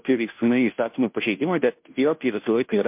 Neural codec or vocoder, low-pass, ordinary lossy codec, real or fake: codec, 16 kHz, 0.5 kbps, FunCodec, trained on Chinese and English, 25 frames a second; 3.6 kHz; MP3, 32 kbps; fake